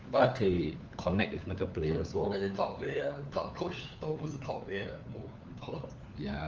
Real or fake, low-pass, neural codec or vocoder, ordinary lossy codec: fake; 7.2 kHz; codec, 16 kHz, 4 kbps, FunCodec, trained on LibriTTS, 50 frames a second; Opus, 24 kbps